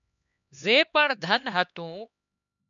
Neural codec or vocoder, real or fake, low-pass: codec, 16 kHz, 2 kbps, X-Codec, HuBERT features, trained on LibriSpeech; fake; 7.2 kHz